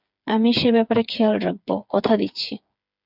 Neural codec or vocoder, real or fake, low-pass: codec, 16 kHz, 8 kbps, FreqCodec, smaller model; fake; 5.4 kHz